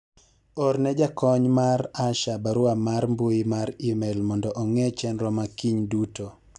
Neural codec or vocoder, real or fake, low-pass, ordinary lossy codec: none; real; 10.8 kHz; none